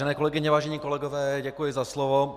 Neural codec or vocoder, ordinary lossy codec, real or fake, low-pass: none; Opus, 64 kbps; real; 14.4 kHz